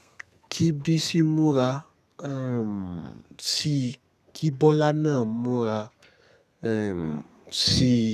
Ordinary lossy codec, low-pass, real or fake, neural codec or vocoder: none; 14.4 kHz; fake; codec, 32 kHz, 1.9 kbps, SNAC